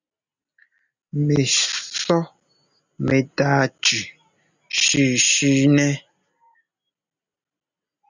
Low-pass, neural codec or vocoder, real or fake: 7.2 kHz; none; real